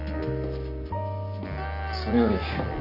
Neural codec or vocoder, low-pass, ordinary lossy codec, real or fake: none; 5.4 kHz; none; real